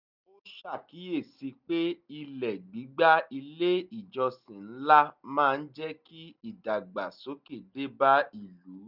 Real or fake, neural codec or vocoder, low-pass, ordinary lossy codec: real; none; 5.4 kHz; none